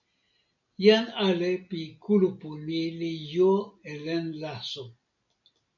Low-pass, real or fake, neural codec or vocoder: 7.2 kHz; real; none